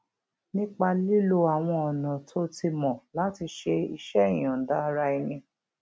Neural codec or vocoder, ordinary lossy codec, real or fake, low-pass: none; none; real; none